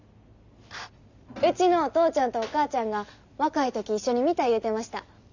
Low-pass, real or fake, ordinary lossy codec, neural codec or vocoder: 7.2 kHz; real; none; none